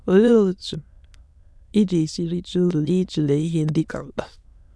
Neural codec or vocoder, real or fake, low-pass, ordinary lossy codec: autoencoder, 22.05 kHz, a latent of 192 numbers a frame, VITS, trained on many speakers; fake; none; none